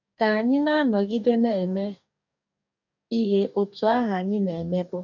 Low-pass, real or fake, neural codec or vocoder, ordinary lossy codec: 7.2 kHz; fake; codec, 44.1 kHz, 2.6 kbps, DAC; none